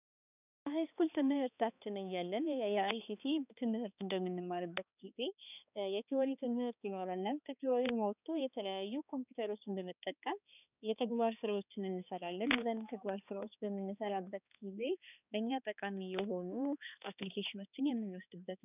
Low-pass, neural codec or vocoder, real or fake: 3.6 kHz; codec, 16 kHz, 2 kbps, X-Codec, HuBERT features, trained on balanced general audio; fake